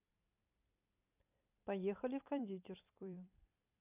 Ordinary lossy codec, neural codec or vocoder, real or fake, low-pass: none; vocoder, 44.1 kHz, 128 mel bands every 256 samples, BigVGAN v2; fake; 3.6 kHz